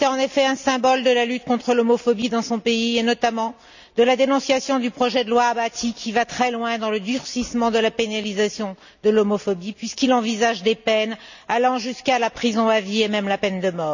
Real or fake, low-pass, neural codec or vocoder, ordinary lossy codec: real; 7.2 kHz; none; none